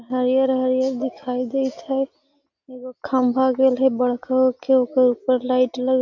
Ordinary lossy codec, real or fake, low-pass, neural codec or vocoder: none; real; 7.2 kHz; none